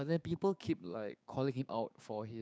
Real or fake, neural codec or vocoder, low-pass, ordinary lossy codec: fake; codec, 16 kHz, 6 kbps, DAC; none; none